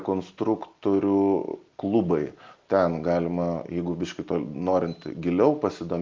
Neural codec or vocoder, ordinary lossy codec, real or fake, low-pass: none; Opus, 32 kbps; real; 7.2 kHz